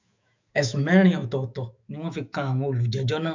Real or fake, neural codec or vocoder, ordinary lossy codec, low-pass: fake; autoencoder, 48 kHz, 128 numbers a frame, DAC-VAE, trained on Japanese speech; MP3, 64 kbps; 7.2 kHz